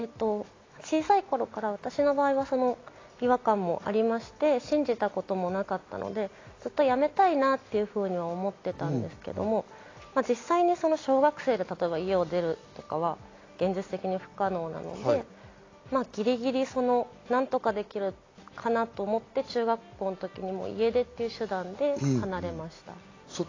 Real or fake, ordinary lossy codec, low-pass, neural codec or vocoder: real; AAC, 32 kbps; 7.2 kHz; none